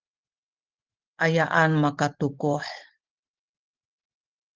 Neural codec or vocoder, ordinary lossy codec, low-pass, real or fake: none; Opus, 16 kbps; 7.2 kHz; real